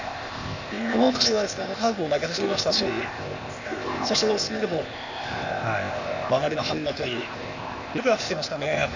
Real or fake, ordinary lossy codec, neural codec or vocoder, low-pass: fake; none; codec, 16 kHz, 0.8 kbps, ZipCodec; 7.2 kHz